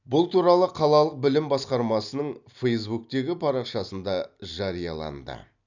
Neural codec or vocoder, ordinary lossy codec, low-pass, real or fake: none; none; 7.2 kHz; real